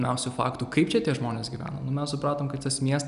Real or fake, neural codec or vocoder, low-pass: real; none; 10.8 kHz